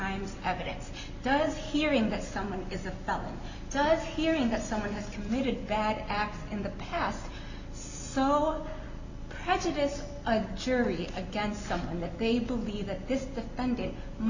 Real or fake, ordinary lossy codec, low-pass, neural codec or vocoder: real; Opus, 64 kbps; 7.2 kHz; none